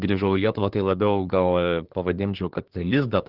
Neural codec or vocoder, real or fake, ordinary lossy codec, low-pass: codec, 24 kHz, 1 kbps, SNAC; fake; Opus, 16 kbps; 5.4 kHz